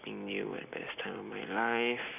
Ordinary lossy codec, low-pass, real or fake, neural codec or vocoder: none; 3.6 kHz; real; none